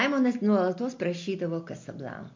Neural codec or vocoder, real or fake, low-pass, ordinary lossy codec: none; real; 7.2 kHz; MP3, 48 kbps